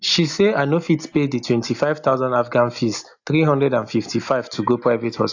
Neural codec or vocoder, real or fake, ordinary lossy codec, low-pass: none; real; AAC, 48 kbps; 7.2 kHz